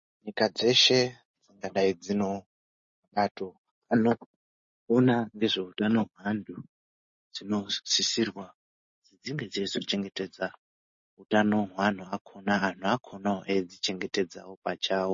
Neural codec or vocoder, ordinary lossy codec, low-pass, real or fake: none; MP3, 32 kbps; 7.2 kHz; real